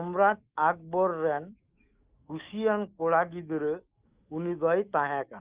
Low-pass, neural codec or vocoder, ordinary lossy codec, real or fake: 3.6 kHz; autoencoder, 48 kHz, 128 numbers a frame, DAC-VAE, trained on Japanese speech; Opus, 32 kbps; fake